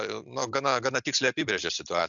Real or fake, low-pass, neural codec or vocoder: real; 7.2 kHz; none